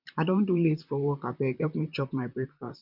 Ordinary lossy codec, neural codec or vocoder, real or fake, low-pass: none; vocoder, 44.1 kHz, 128 mel bands, Pupu-Vocoder; fake; 5.4 kHz